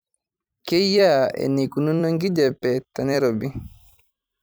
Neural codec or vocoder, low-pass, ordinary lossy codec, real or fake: vocoder, 44.1 kHz, 128 mel bands every 256 samples, BigVGAN v2; none; none; fake